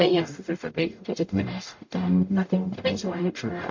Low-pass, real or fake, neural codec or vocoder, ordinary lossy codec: 7.2 kHz; fake; codec, 44.1 kHz, 0.9 kbps, DAC; MP3, 48 kbps